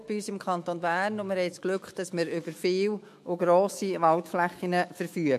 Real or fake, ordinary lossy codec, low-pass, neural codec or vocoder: fake; MP3, 64 kbps; 14.4 kHz; autoencoder, 48 kHz, 128 numbers a frame, DAC-VAE, trained on Japanese speech